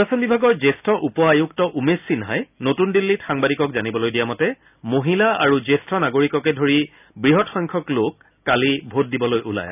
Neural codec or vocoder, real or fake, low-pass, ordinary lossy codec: none; real; 3.6 kHz; none